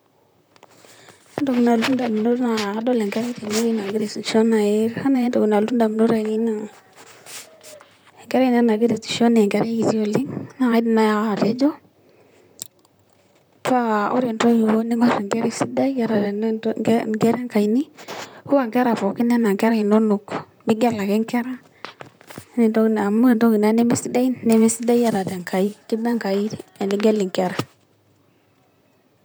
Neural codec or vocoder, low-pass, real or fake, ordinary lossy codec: vocoder, 44.1 kHz, 128 mel bands, Pupu-Vocoder; none; fake; none